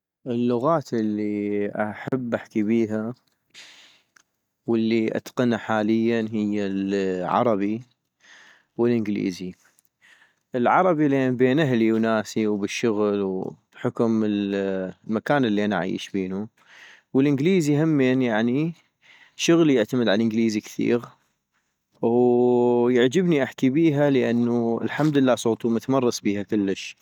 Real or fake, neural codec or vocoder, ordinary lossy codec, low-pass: real; none; none; 19.8 kHz